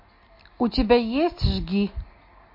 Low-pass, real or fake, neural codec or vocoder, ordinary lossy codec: 5.4 kHz; real; none; MP3, 32 kbps